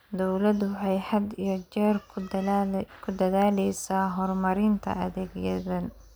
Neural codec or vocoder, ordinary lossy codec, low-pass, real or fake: none; none; none; real